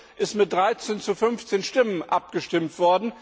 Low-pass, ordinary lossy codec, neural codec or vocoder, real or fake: none; none; none; real